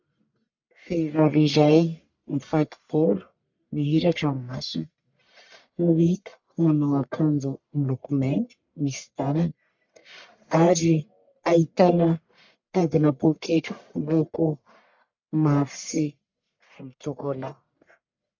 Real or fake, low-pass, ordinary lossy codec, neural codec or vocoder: fake; 7.2 kHz; MP3, 64 kbps; codec, 44.1 kHz, 1.7 kbps, Pupu-Codec